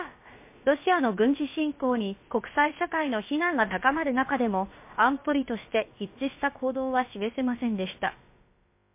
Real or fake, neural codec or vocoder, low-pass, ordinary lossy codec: fake; codec, 16 kHz, about 1 kbps, DyCAST, with the encoder's durations; 3.6 kHz; MP3, 24 kbps